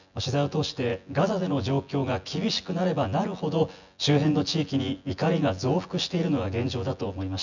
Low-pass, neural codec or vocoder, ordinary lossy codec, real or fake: 7.2 kHz; vocoder, 24 kHz, 100 mel bands, Vocos; none; fake